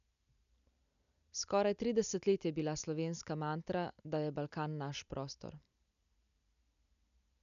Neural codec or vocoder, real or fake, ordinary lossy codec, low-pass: none; real; none; 7.2 kHz